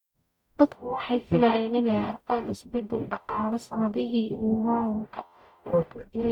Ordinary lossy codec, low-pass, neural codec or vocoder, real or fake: none; 19.8 kHz; codec, 44.1 kHz, 0.9 kbps, DAC; fake